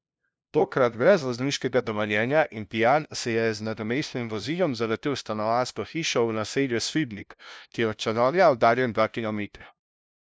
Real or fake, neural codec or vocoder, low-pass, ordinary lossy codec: fake; codec, 16 kHz, 0.5 kbps, FunCodec, trained on LibriTTS, 25 frames a second; none; none